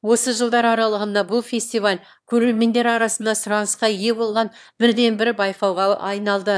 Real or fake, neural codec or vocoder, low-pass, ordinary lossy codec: fake; autoencoder, 22.05 kHz, a latent of 192 numbers a frame, VITS, trained on one speaker; none; none